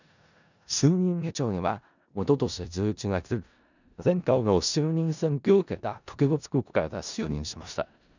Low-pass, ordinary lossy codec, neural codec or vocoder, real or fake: 7.2 kHz; none; codec, 16 kHz in and 24 kHz out, 0.4 kbps, LongCat-Audio-Codec, four codebook decoder; fake